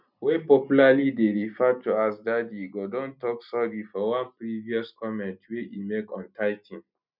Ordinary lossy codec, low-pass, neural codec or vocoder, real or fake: none; 5.4 kHz; none; real